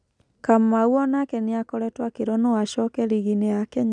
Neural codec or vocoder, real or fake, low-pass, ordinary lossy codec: none; real; 9.9 kHz; none